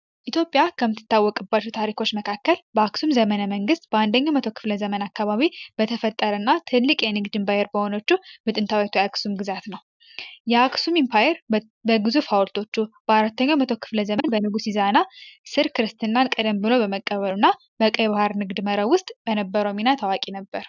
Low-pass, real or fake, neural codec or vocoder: 7.2 kHz; real; none